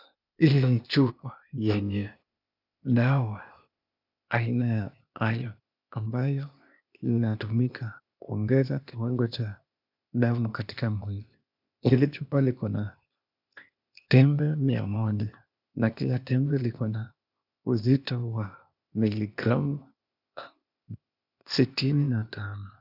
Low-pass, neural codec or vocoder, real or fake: 5.4 kHz; codec, 16 kHz, 0.8 kbps, ZipCodec; fake